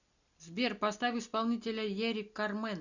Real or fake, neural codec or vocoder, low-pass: real; none; 7.2 kHz